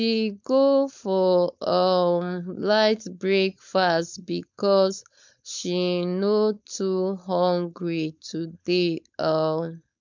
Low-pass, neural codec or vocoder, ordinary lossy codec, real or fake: 7.2 kHz; codec, 16 kHz, 4.8 kbps, FACodec; MP3, 64 kbps; fake